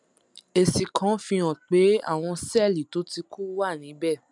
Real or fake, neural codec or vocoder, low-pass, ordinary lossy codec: real; none; 10.8 kHz; none